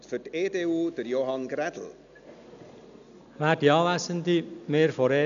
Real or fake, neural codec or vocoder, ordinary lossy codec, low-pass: real; none; none; 7.2 kHz